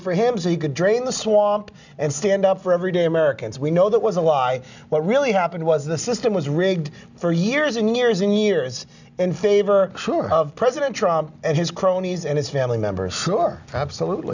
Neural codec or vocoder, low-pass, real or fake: none; 7.2 kHz; real